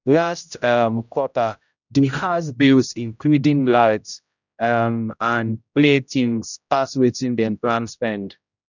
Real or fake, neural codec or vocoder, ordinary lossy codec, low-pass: fake; codec, 16 kHz, 0.5 kbps, X-Codec, HuBERT features, trained on general audio; none; 7.2 kHz